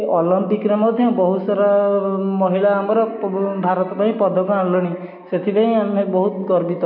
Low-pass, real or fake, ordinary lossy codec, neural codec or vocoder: 5.4 kHz; real; none; none